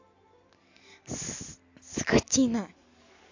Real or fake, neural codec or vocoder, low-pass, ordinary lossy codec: real; none; 7.2 kHz; AAC, 48 kbps